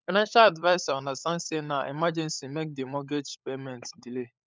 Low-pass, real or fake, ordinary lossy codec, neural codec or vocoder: none; fake; none; codec, 16 kHz, 16 kbps, FunCodec, trained on LibriTTS, 50 frames a second